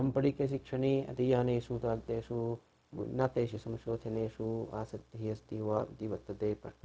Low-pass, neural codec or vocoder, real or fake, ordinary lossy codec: none; codec, 16 kHz, 0.4 kbps, LongCat-Audio-Codec; fake; none